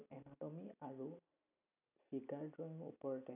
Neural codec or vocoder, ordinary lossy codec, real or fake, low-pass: none; none; real; 3.6 kHz